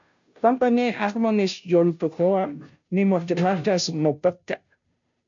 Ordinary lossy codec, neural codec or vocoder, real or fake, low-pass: AAC, 64 kbps; codec, 16 kHz, 0.5 kbps, FunCodec, trained on Chinese and English, 25 frames a second; fake; 7.2 kHz